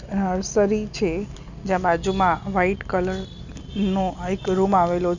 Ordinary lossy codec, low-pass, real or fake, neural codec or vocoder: none; 7.2 kHz; real; none